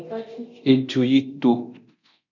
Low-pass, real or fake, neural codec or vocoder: 7.2 kHz; fake; codec, 24 kHz, 0.9 kbps, DualCodec